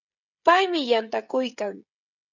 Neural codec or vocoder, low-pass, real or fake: codec, 16 kHz, 16 kbps, FreqCodec, smaller model; 7.2 kHz; fake